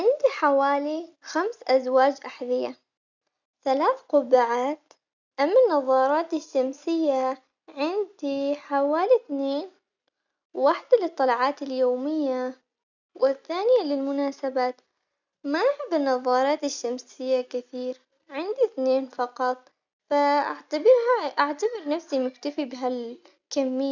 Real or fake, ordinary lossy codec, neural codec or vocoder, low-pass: real; none; none; 7.2 kHz